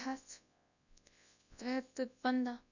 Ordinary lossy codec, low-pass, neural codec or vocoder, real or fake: none; 7.2 kHz; codec, 24 kHz, 0.9 kbps, WavTokenizer, large speech release; fake